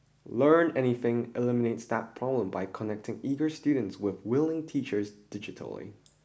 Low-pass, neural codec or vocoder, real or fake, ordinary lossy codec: none; none; real; none